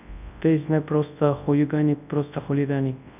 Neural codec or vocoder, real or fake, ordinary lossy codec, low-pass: codec, 24 kHz, 0.9 kbps, WavTokenizer, large speech release; fake; none; 3.6 kHz